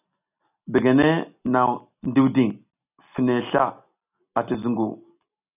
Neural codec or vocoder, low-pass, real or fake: none; 3.6 kHz; real